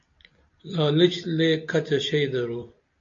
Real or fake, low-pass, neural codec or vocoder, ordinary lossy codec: real; 7.2 kHz; none; AAC, 48 kbps